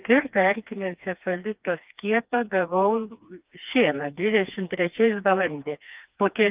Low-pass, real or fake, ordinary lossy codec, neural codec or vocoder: 3.6 kHz; fake; Opus, 24 kbps; codec, 16 kHz, 2 kbps, FreqCodec, smaller model